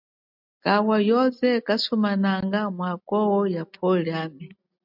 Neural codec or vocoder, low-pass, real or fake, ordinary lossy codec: none; 5.4 kHz; real; MP3, 48 kbps